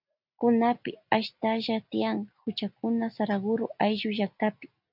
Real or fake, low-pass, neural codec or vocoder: real; 5.4 kHz; none